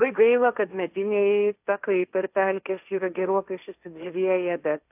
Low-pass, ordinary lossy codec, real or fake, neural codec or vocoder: 3.6 kHz; AAC, 32 kbps; fake; codec, 16 kHz, 1.1 kbps, Voila-Tokenizer